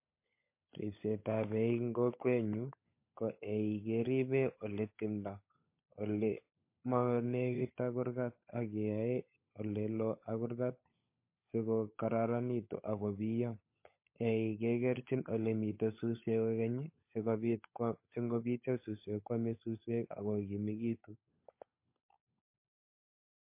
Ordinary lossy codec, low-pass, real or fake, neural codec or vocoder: MP3, 24 kbps; 3.6 kHz; fake; codec, 16 kHz, 16 kbps, FunCodec, trained on LibriTTS, 50 frames a second